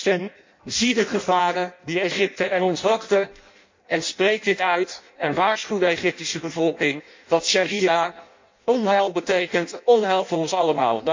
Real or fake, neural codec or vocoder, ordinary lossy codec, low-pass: fake; codec, 16 kHz in and 24 kHz out, 0.6 kbps, FireRedTTS-2 codec; MP3, 48 kbps; 7.2 kHz